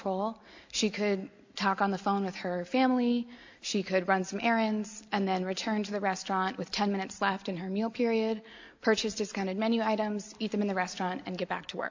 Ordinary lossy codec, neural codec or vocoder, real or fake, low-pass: AAC, 48 kbps; none; real; 7.2 kHz